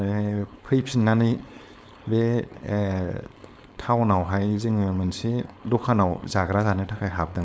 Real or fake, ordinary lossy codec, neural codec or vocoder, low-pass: fake; none; codec, 16 kHz, 4.8 kbps, FACodec; none